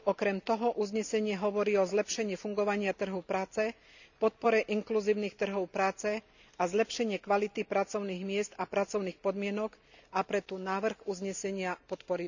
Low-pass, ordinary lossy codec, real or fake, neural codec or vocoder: 7.2 kHz; none; real; none